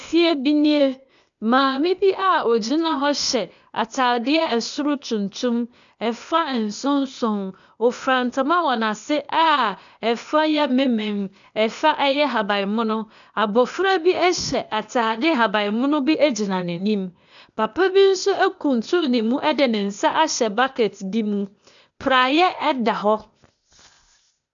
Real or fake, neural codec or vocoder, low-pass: fake; codec, 16 kHz, 0.8 kbps, ZipCodec; 7.2 kHz